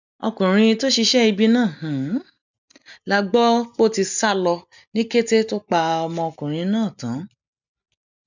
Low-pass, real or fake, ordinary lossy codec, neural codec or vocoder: 7.2 kHz; real; none; none